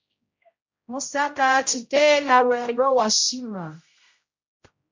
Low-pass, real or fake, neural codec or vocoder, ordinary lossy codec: 7.2 kHz; fake; codec, 16 kHz, 0.5 kbps, X-Codec, HuBERT features, trained on general audio; MP3, 48 kbps